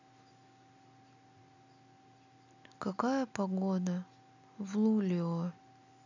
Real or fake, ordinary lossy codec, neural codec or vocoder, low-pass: real; none; none; 7.2 kHz